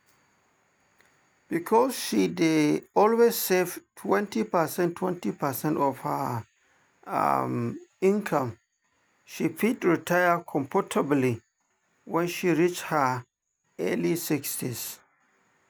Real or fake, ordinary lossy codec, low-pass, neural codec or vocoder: real; none; none; none